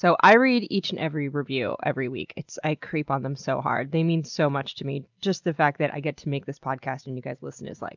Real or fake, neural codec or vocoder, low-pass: real; none; 7.2 kHz